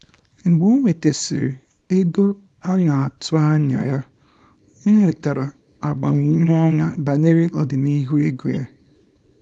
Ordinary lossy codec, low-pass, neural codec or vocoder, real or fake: none; none; codec, 24 kHz, 0.9 kbps, WavTokenizer, small release; fake